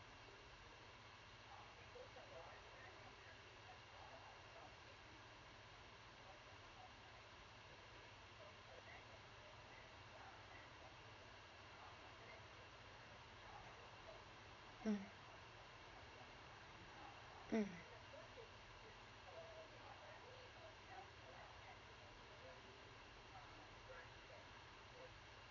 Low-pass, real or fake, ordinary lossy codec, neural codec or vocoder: 7.2 kHz; fake; MP3, 64 kbps; vocoder, 44.1 kHz, 80 mel bands, Vocos